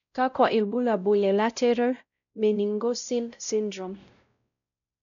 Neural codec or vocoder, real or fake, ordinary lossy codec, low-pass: codec, 16 kHz, 0.5 kbps, X-Codec, WavLM features, trained on Multilingual LibriSpeech; fake; none; 7.2 kHz